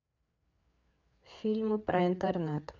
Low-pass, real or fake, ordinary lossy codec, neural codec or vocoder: 7.2 kHz; fake; none; codec, 16 kHz, 4 kbps, FreqCodec, larger model